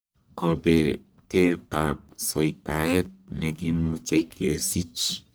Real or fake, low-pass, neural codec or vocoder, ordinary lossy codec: fake; none; codec, 44.1 kHz, 1.7 kbps, Pupu-Codec; none